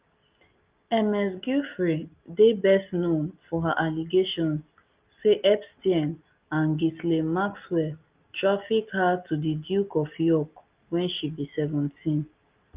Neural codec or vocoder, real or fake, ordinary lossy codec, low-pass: none; real; Opus, 24 kbps; 3.6 kHz